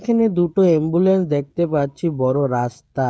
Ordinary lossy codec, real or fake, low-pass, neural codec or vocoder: none; fake; none; codec, 16 kHz, 16 kbps, FreqCodec, smaller model